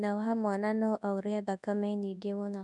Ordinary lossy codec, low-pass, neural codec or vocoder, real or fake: none; 10.8 kHz; codec, 24 kHz, 0.9 kbps, WavTokenizer, large speech release; fake